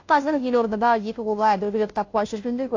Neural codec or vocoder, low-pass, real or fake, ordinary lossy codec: codec, 16 kHz, 0.5 kbps, FunCodec, trained on Chinese and English, 25 frames a second; 7.2 kHz; fake; AAC, 48 kbps